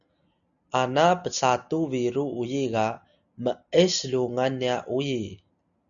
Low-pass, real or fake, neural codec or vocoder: 7.2 kHz; real; none